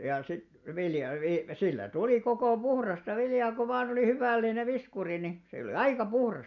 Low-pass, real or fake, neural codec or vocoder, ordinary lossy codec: 7.2 kHz; real; none; none